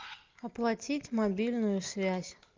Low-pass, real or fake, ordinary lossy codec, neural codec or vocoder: 7.2 kHz; real; Opus, 24 kbps; none